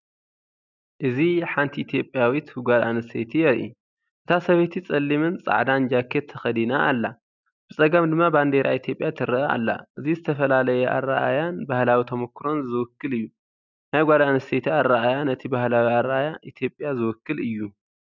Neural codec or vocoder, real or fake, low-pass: none; real; 7.2 kHz